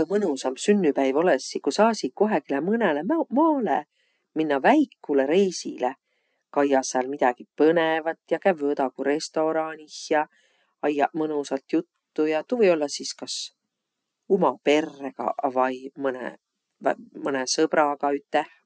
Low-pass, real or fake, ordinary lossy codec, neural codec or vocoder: none; real; none; none